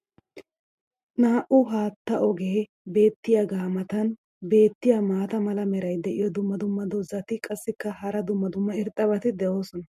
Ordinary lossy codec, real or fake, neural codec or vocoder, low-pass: MP3, 64 kbps; real; none; 14.4 kHz